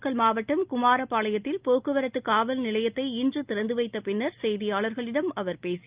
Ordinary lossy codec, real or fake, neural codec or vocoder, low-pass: Opus, 24 kbps; real; none; 3.6 kHz